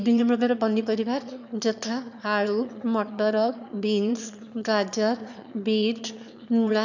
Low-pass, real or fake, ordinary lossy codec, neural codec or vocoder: 7.2 kHz; fake; none; autoencoder, 22.05 kHz, a latent of 192 numbers a frame, VITS, trained on one speaker